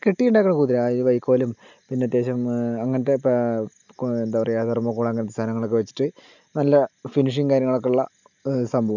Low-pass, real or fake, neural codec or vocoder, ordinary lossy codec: 7.2 kHz; real; none; none